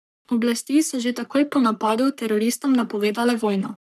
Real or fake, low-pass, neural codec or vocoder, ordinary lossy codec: fake; 14.4 kHz; codec, 44.1 kHz, 3.4 kbps, Pupu-Codec; none